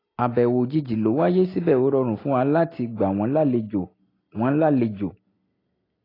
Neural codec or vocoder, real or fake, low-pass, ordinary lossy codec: none; real; 5.4 kHz; AAC, 24 kbps